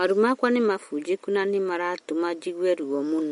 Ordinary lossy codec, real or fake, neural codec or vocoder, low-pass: MP3, 48 kbps; real; none; 10.8 kHz